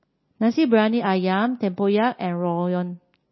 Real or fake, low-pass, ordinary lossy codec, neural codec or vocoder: real; 7.2 kHz; MP3, 24 kbps; none